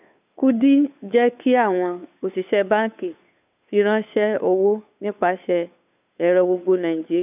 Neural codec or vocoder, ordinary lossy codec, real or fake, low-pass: codec, 16 kHz, 2 kbps, FunCodec, trained on Chinese and English, 25 frames a second; none; fake; 3.6 kHz